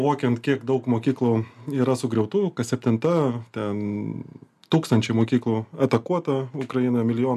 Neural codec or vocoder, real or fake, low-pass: none; real; 14.4 kHz